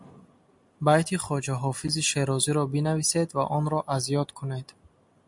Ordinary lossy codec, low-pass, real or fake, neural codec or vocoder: MP3, 64 kbps; 10.8 kHz; real; none